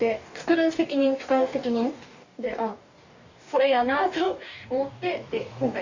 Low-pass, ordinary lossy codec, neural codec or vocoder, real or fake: 7.2 kHz; Opus, 64 kbps; codec, 44.1 kHz, 2.6 kbps, DAC; fake